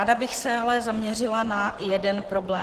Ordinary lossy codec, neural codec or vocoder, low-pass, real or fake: Opus, 16 kbps; vocoder, 44.1 kHz, 128 mel bands, Pupu-Vocoder; 14.4 kHz; fake